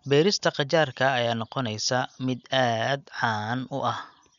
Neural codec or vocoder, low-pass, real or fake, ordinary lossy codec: none; 7.2 kHz; real; none